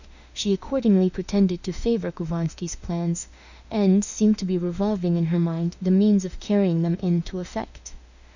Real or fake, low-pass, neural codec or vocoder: fake; 7.2 kHz; autoencoder, 48 kHz, 32 numbers a frame, DAC-VAE, trained on Japanese speech